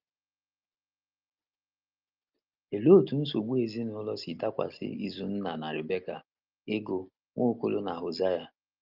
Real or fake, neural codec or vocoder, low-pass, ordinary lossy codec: real; none; 5.4 kHz; Opus, 24 kbps